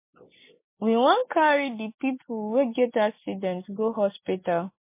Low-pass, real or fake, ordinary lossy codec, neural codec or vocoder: 3.6 kHz; real; MP3, 24 kbps; none